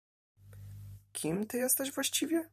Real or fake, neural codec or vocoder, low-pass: fake; vocoder, 44.1 kHz, 128 mel bands every 512 samples, BigVGAN v2; 14.4 kHz